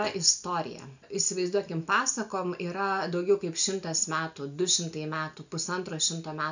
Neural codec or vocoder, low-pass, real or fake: none; 7.2 kHz; real